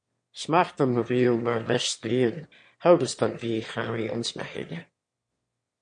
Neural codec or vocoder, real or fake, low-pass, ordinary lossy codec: autoencoder, 22.05 kHz, a latent of 192 numbers a frame, VITS, trained on one speaker; fake; 9.9 kHz; MP3, 48 kbps